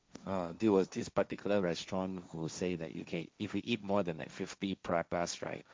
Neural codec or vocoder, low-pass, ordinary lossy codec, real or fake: codec, 16 kHz, 1.1 kbps, Voila-Tokenizer; none; none; fake